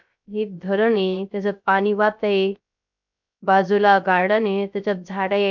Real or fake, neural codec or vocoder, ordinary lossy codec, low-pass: fake; codec, 16 kHz, 0.3 kbps, FocalCodec; MP3, 64 kbps; 7.2 kHz